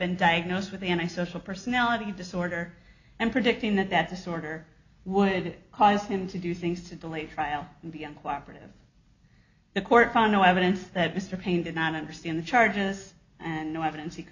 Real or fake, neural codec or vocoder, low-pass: real; none; 7.2 kHz